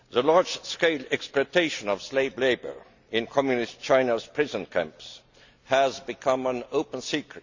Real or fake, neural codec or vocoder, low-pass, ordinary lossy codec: real; none; 7.2 kHz; Opus, 64 kbps